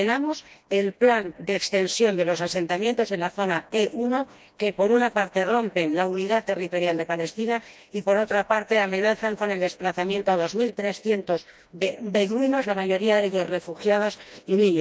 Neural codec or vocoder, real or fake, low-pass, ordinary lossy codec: codec, 16 kHz, 1 kbps, FreqCodec, smaller model; fake; none; none